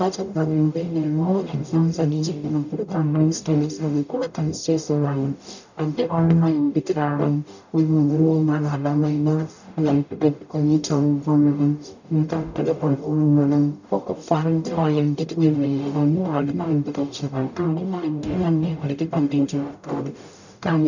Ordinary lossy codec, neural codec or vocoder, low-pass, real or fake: none; codec, 44.1 kHz, 0.9 kbps, DAC; 7.2 kHz; fake